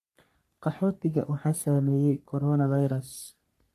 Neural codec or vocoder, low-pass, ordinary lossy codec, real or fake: codec, 44.1 kHz, 3.4 kbps, Pupu-Codec; 14.4 kHz; MP3, 64 kbps; fake